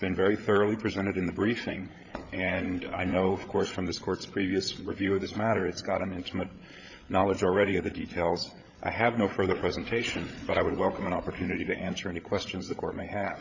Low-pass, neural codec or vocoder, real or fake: 7.2 kHz; codec, 16 kHz, 8 kbps, FreqCodec, larger model; fake